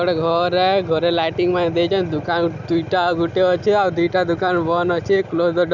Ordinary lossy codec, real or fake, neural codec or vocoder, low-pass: none; real; none; 7.2 kHz